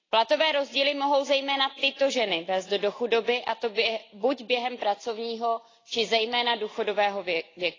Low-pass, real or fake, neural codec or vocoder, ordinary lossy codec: 7.2 kHz; real; none; AAC, 32 kbps